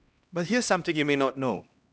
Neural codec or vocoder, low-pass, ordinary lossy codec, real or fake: codec, 16 kHz, 1 kbps, X-Codec, HuBERT features, trained on LibriSpeech; none; none; fake